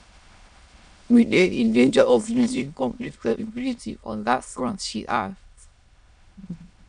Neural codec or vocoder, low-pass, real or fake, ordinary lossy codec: autoencoder, 22.05 kHz, a latent of 192 numbers a frame, VITS, trained on many speakers; 9.9 kHz; fake; none